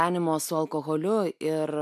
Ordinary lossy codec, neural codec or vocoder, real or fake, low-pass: AAC, 96 kbps; none; real; 14.4 kHz